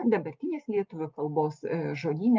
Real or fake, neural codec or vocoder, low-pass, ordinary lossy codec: real; none; 7.2 kHz; Opus, 24 kbps